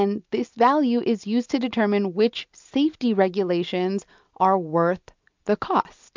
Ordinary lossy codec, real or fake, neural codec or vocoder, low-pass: MP3, 64 kbps; real; none; 7.2 kHz